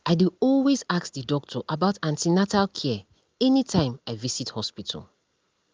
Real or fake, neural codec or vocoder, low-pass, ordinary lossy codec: real; none; 7.2 kHz; Opus, 24 kbps